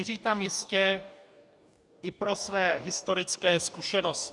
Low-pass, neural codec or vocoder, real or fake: 10.8 kHz; codec, 44.1 kHz, 2.6 kbps, DAC; fake